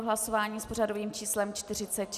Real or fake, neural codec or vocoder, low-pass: real; none; 14.4 kHz